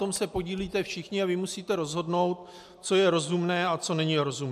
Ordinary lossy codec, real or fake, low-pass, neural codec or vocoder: MP3, 96 kbps; real; 14.4 kHz; none